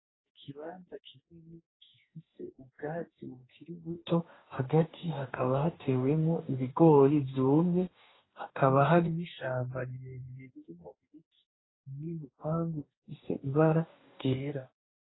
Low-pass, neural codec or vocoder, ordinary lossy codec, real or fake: 7.2 kHz; codec, 44.1 kHz, 2.6 kbps, DAC; AAC, 16 kbps; fake